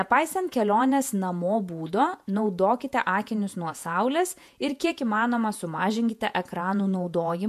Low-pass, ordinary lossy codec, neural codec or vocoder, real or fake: 14.4 kHz; MP3, 96 kbps; vocoder, 48 kHz, 128 mel bands, Vocos; fake